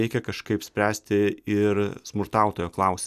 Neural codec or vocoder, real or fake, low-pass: none; real; 14.4 kHz